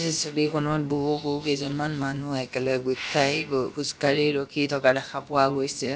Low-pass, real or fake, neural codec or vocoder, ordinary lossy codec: none; fake; codec, 16 kHz, about 1 kbps, DyCAST, with the encoder's durations; none